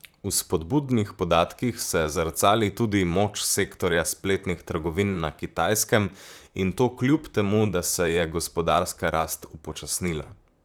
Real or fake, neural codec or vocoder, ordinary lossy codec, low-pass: fake; vocoder, 44.1 kHz, 128 mel bands, Pupu-Vocoder; none; none